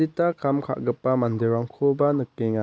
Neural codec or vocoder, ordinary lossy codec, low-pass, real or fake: none; none; none; real